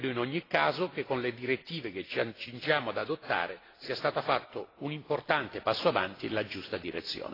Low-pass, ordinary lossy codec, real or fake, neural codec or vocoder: 5.4 kHz; AAC, 24 kbps; real; none